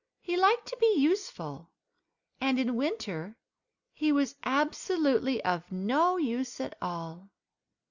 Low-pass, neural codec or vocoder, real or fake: 7.2 kHz; none; real